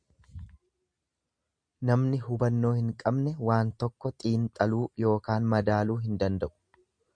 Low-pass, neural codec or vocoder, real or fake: 9.9 kHz; none; real